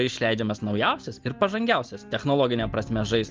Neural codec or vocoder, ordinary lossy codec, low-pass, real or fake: none; Opus, 32 kbps; 7.2 kHz; real